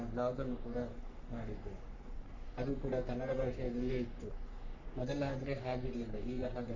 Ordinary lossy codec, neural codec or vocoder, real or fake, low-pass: none; codec, 44.1 kHz, 3.4 kbps, Pupu-Codec; fake; 7.2 kHz